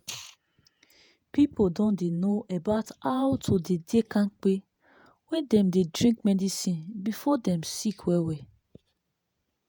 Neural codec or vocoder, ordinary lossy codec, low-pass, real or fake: vocoder, 48 kHz, 128 mel bands, Vocos; none; none; fake